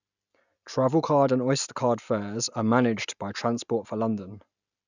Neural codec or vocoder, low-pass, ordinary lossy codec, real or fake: none; 7.2 kHz; none; real